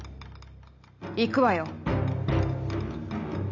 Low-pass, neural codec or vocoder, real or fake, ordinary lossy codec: 7.2 kHz; none; real; none